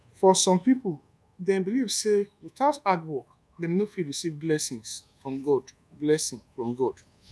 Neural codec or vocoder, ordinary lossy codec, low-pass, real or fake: codec, 24 kHz, 1.2 kbps, DualCodec; none; none; fake